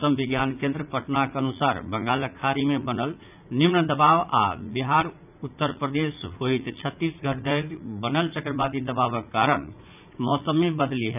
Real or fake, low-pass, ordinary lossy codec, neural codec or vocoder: fake; 3.6 kHz; none; vocoder, 44.1 kHz, 80 mel bands, Vocos